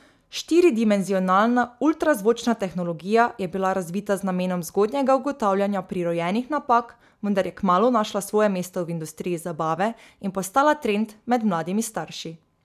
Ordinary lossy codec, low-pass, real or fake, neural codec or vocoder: none; 14.4 kHz; real; none